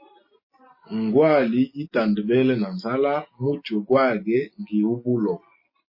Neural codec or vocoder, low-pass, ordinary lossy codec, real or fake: none; 5.4 kHz; MP3, 24 kbps; real